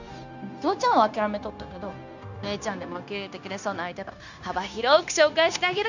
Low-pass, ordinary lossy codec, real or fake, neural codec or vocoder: 7.2 kHz; none; fake; codec, 16 kHz, 0.9 kbps, LongCat-Audio-Codec